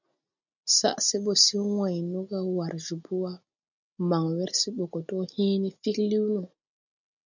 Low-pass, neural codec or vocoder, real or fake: 7.2 kHz; none; real